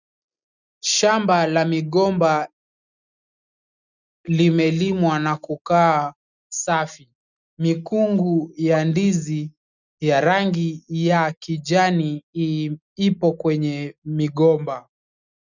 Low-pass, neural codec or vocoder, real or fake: 7.2 kHz; none; real